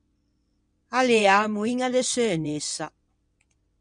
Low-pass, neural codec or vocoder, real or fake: 9.9 kHz; vocoder, 22.05 kHz, 80 mel bands, WaveNeXt; fake